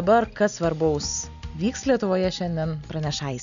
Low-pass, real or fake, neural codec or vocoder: 7.2 kHz; real; none